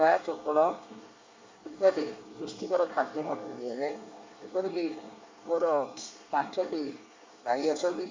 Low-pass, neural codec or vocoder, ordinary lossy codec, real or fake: 7.2 kHz; codec, 24 kHz, 1 kbps, SNAC; MP3, 64 kbps; fake